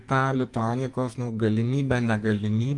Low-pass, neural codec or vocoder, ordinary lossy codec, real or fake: 10.8 kHz; codec, 44.1 kHz, 2.6 kbps, SNAC; Opus, 32 kbps; fake